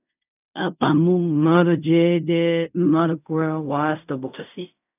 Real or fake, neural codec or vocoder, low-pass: fake; codec, 16 kHz in and 24 kHz out, 0.4 kbps, LongCat-Audio-Codec, fine tuned four codebook decoder; 3.6 kHz